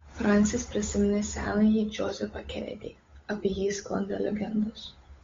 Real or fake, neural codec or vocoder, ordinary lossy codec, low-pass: fake; codec, 16 kHz, 8 kbps, FunCodec, trained on Chinese and English, 25 frames a second; AAC, 24 kbps; 7.2 kHz